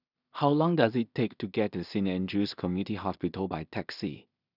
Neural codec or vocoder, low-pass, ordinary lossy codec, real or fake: codec, 16 kHz in and 24 kHz out, 0.4 kbps, LongCat-Audio-Codec, two codebook decoder; 5.4 kHz; none; fake